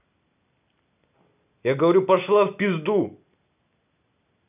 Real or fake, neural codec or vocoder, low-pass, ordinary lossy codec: real; none; 3.6 kHz; none